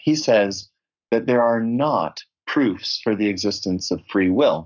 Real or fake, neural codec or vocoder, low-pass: fake; codec, 44.1 kHz, 7.8 kbps, Pupu-Codec; 7.2 kHz